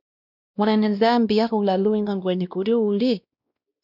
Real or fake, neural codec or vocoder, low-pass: fake; codec, 16 kHz, 1 kbps, X-Codec, WavLM features, trained on Multilingual LibriSpeech; 5.4 kHz